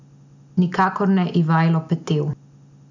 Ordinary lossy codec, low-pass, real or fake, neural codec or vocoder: none; 7.2 kHz; real; none